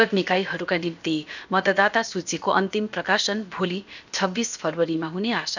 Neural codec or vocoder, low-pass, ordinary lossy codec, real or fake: codec, 16 kHz, about 1 kbps, DyCAST, with the encoder's durations; 7.2 kHz; none; fake